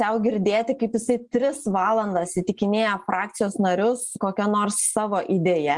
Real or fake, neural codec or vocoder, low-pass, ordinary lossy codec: real; none; 10.8 kHz; Opus, 32 kbps